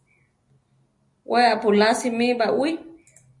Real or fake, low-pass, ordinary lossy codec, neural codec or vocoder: real; 10.8 kHz; MP3, 48 kbps; none